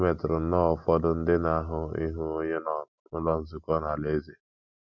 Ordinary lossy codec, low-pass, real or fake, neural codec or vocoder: none; 7.2 kHz; real; none